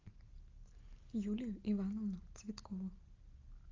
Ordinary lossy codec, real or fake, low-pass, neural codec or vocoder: Opus, 32 kbps; real; 7.2 kHz; none